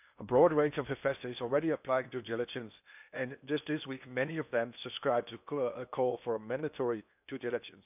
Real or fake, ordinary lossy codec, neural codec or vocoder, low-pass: fake; none; codec, 16 kHz in and 24 kHz out, 0.8 kbps, FocalCodec, streaming, 65536 codes; 3.6 kHz